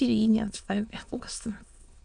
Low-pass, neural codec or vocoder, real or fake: 9.9 kHz; autoencoder, 22.05 kHz, a latent of 192 numbers a frame, VITS, trained on many speakers; fake